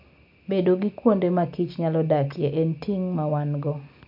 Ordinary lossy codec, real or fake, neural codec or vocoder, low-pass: MP3, 48 kbps; real; none; 5.4 kHz